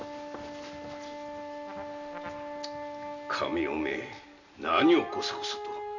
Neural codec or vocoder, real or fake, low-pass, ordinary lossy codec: none; real; 7.2 kHz; none